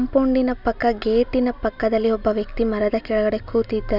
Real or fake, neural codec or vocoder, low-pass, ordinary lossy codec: real; none; 5.4 kHz; none